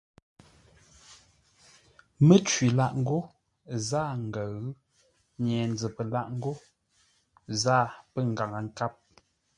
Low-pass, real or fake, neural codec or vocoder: 10.8 kHz; real; none